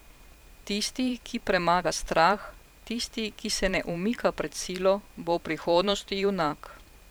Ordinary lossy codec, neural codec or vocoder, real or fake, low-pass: none; vocoder, 44.1 kHz, 128 mel bands every 512 samples, BigVGAN v2; fake; none